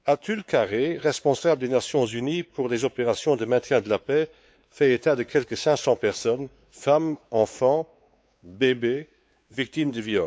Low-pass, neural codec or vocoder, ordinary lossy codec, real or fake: none; codec, 16 kHz, 4 kbps, X-Codec, WavLM features, trained on Multilingual LibriSpeech; none; fake